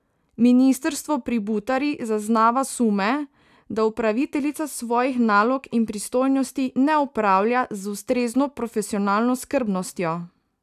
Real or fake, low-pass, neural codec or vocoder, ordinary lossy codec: real; 14.4 kHz; none; none